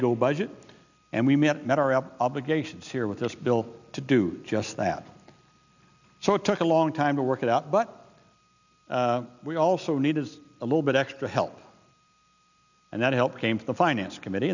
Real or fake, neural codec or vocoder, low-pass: real; none; 7.2 kHz